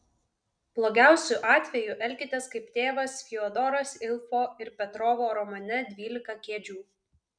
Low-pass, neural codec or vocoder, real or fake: 9.9 kHz; none; real